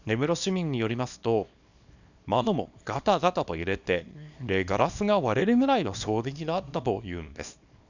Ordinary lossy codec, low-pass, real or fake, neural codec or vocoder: none; 7.2 kHz; fake; codec, 24 kHz, 0.9 kbps, WavTokenizer, small release